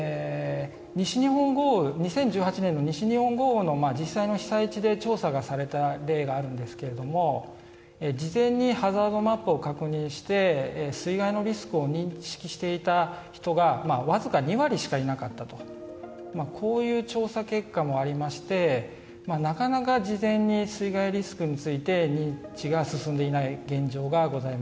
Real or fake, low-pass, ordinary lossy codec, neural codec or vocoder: real; none; none; none